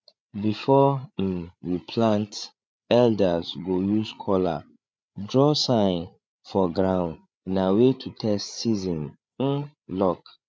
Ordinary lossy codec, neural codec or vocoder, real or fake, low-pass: none; codec, 16 kHz, 8 kbps, FreqCodec, larger model; fake; none